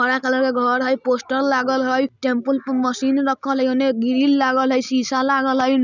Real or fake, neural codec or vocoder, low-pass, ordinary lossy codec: real; none; 7.2 kHz; none